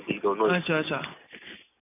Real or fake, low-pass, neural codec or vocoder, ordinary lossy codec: real; 3.6 kHz; none; none